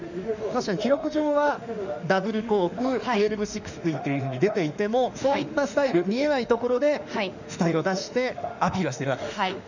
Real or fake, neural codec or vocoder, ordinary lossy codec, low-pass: fake; autoencoder, 48 kHz, 32 numbers a frame, DAC-VAE, trained on Japanese speech; none; 7.2 kHz